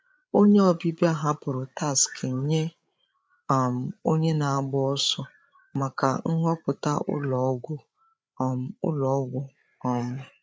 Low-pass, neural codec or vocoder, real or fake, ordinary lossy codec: none; codec, 16 kHz, 8 kbps, FreqCodec, larger model; fake; none